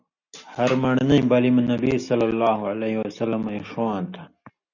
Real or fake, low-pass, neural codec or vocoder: real; 7.2 kHz; none